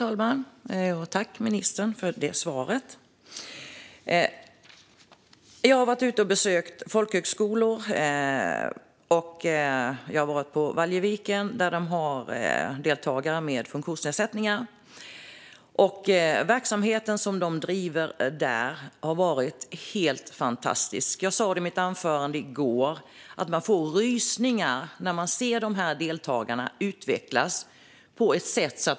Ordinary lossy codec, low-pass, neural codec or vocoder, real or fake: none; none; none; real